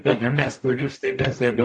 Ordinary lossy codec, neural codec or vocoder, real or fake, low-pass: AAC, 64 kbps; codec, 44.1 kHz, 0.9 kbps, DAC; fake; 10.8 kHz